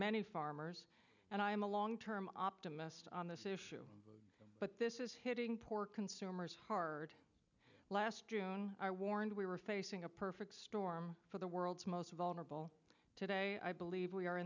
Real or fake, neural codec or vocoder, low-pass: real; none; 7.2 kHz